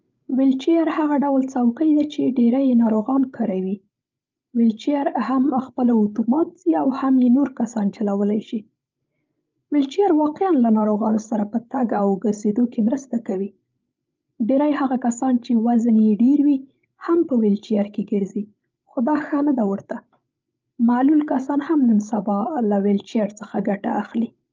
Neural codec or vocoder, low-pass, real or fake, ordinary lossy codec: codec, 16 kHz, 16 kbps, FreqCodec, larger model; 7.2 kHz; fake; Opus, 24 kbps